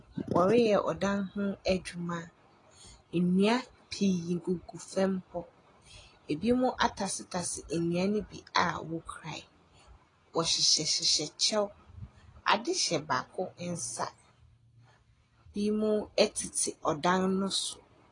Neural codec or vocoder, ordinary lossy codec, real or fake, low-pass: none; AAC, 32 kbps; real; 10.8 kHz